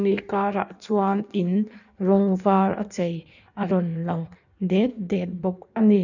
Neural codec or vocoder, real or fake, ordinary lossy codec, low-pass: codec, 16 kHz in and 24 kHz out, 1.1 kbps, FireRedTTS-2 codec; fake; none; 7.2 kHz